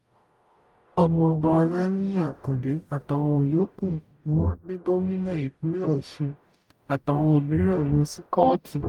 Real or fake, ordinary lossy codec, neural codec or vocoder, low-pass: fake; Opus, 32 kbps; codec, 44.1 kHz, 0.9 kbps, DAC; 19.8 kHz